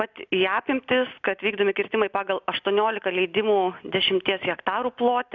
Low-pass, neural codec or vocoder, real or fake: 7.2 kHz; none; real